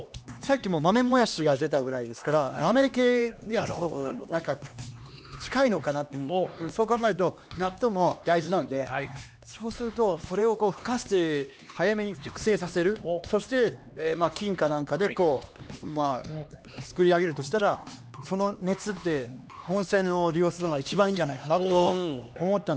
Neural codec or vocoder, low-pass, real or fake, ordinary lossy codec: codec, 16 kHz, 2 kbps, X-Codec, HuBERT features, trained on LibriSpeech; none; fake; none